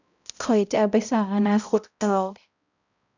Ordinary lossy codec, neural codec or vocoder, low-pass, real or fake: none; codec, 16 kHz, 0.5 kbps, X-Codec, HuBERT features, trained on balanced general audio; 7.2 kHz; fake